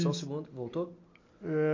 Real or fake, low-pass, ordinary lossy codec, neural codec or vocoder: real; 7.2 kHz; MP3, 48 kbps; none